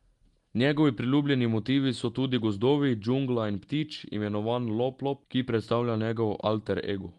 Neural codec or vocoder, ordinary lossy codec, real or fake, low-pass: none; Opus, 24 kbps; real; 10.8 kHz